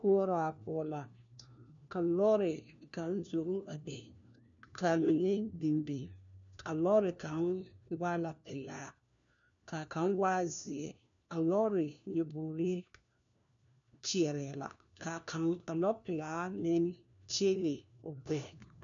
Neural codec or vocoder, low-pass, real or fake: codec, 16 kHz, 1 kbps, FunCodec, trained on LibriTTS, 50 frames a second; 7.2 kHz; fake